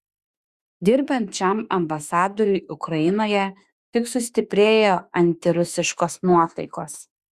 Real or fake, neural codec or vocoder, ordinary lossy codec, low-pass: fake; autoencoder, 48 kHz, 32 numbers a frame, DAC-VAE, trained on Japanese speech; Opus, 64 kbps; 14.4 kHz